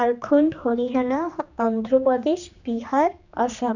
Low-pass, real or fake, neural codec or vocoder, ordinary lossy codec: 7.2 kHz; fake; codec, 16 kHz, 2 kbps, X-Codec, HuBERT features, trained on general audio; none